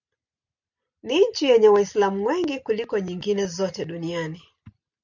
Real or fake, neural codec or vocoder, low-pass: real; none; 7.2 kHz